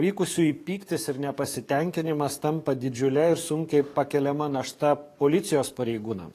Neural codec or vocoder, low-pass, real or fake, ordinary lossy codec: codec, 44.1 kHz, 7.8 kbps, DAC; 14.4 kHz; fake; AAC, 48 kbps